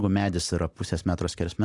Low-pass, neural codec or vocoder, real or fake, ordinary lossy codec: 10.8 kHz; none; real; AAC, 64 kbps